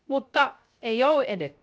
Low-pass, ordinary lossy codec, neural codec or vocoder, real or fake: none; none; codec, 16 kHz, 0.3 kbps, FocalCodec; fake